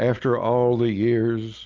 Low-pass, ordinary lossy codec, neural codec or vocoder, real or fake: 7.2 kHz; Opus, 24 kbps; none; real